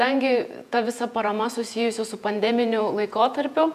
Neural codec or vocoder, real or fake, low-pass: vocoder, 48 kHz, 128 mel bands, Vocos; fake; 14.4 kHz